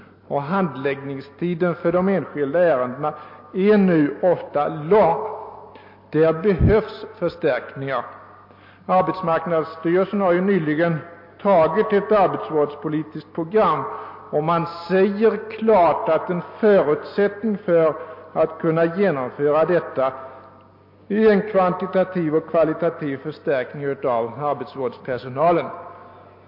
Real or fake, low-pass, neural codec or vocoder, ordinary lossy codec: real; 5.4 kHz; none; MP3, 32 kbps